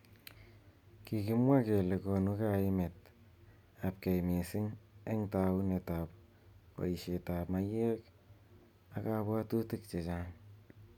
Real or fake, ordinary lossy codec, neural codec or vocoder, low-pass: real; none; none; 19.8 kHz